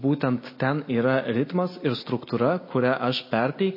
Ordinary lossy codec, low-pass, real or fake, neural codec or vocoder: MP3, 24 kbps; 5.4 kHz; real; none